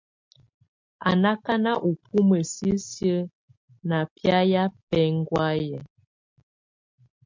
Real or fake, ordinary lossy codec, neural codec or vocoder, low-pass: real; MP3, 48 kbps; none; 7.2 kHz